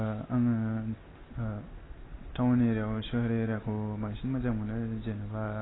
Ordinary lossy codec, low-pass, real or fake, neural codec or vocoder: AAC, 16 kbps; 7.2 kHz; real; none